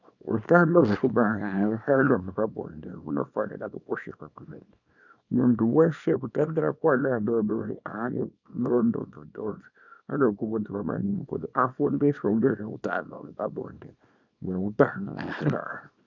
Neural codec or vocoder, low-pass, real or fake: codec, 24 kHz, 0.9 kbps, WavTokenizer, small release; 7.2 kHz; fake